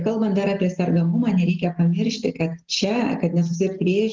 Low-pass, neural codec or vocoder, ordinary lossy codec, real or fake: 7.2 kHz; none; Opus, 16 kbps; real